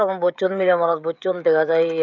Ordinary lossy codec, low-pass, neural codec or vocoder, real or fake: none; 7.2 kHz; codec, 16 kHz, 16 kbps, FreqCodec, smaller model; fake